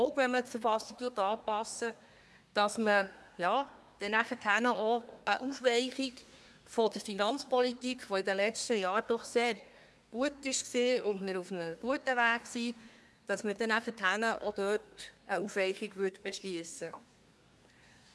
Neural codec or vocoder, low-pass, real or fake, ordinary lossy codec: codec, 24 kHz, 1 kbps, SNAC; none; fake; none